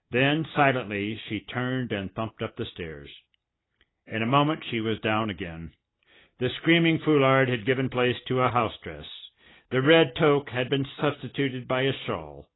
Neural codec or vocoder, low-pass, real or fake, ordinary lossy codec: none; 7.2 kHz; real; AAC, 16 kbps